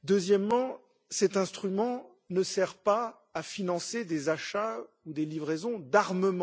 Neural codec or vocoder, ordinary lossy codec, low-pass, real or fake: none; none; none; real